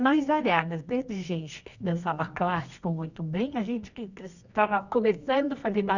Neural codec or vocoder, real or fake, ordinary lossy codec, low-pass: codec, 24 kHz, 0.9 kbps, WavTokenizer, medium music audio release; fake; none; 7.2 kHz